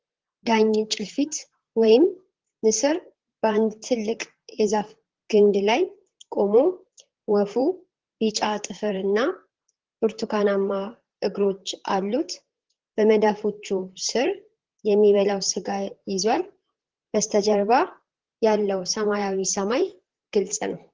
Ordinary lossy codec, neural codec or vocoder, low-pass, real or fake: Opus, 16 kbps; vocoder, 44.1 kHz, 128 mel bands, Pupu-Vocoder; 7.2 kHz; fake